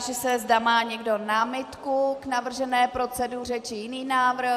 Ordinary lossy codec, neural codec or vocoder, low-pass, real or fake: AAC, 96 kbps; vocoder, 44.1 kHz, 128 mel bands every 256 samples, BigVGAN v2; 14.4 kHz; fake